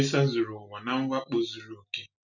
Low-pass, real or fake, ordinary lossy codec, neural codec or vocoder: 7.2 kHz; real; none; none